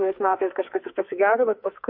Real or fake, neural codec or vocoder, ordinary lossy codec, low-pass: fake; codec, 44.1 kHz, 2.6 kbps, SNAC; MP3, 32 kbps; 5.4 kHz